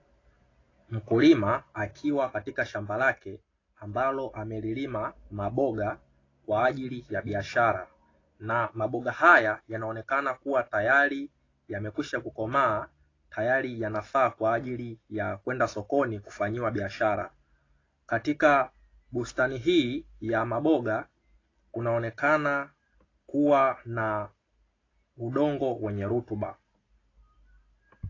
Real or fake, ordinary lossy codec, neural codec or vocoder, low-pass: real; AAC, 32 kbps; none; 7.2 kHz